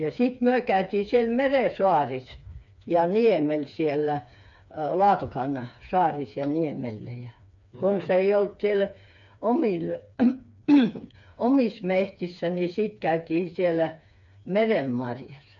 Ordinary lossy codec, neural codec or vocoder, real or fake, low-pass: none; codec, 16 kHz, 4 kbps, FreqCodec, smaller model; fake; 7.2 kHz